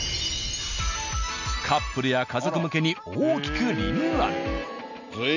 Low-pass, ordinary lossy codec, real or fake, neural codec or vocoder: 7.2 kHz; none; real; none